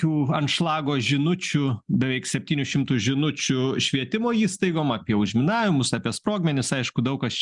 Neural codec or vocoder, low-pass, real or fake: none; 10.8 kHz; real